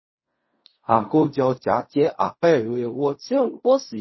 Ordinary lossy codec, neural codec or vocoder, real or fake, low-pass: MP3, 24 kbps; codec, 16 kHz in and 24 kHz out, 0.4 kbps, LongCat-Audio-Codec, fine tuned four codebook decoder; fake; 7.2 kHz